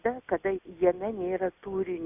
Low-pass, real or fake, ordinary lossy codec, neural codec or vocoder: 3.6 kHz; real; AAC, 32 kbps; none